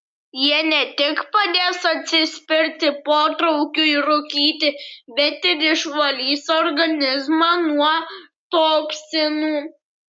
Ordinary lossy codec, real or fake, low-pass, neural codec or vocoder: MP3, 96 kbps; real; 9.9 kHz; none